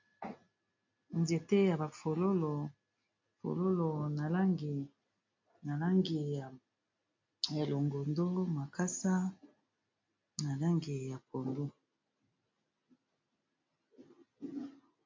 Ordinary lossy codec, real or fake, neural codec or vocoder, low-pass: MP3, 48 kbps; real; none; 7.2 kHz